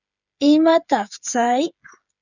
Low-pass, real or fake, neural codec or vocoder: 7.2 kHz; fake; codec, 16 kHz, 8 kbps, FreqCodec, smaller model